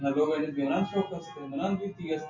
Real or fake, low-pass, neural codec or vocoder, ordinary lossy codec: real; 7.2 kHz; none; AAC, 48 kbps